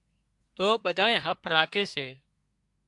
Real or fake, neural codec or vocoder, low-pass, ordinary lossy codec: fake; codec, 24 kHz, 1 kbps, SNAC; 10.8 kHz; AAC, 64 kbps